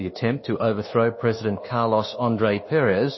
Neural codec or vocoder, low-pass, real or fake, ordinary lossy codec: codec, 24 kHz, 1.2 kbps, DualCodec; 7.2 kHz; fake; MP3, 24 kbps